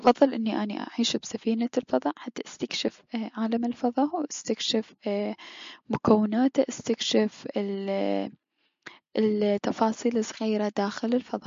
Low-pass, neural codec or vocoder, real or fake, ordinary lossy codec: 7.2 kHz; none; real; MP3, 48 kbps